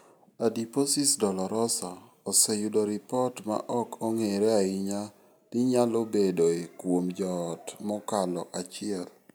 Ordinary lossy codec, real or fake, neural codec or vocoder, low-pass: none; real; none; none